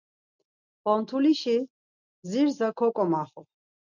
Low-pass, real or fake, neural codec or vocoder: 7.2 kHz; real; none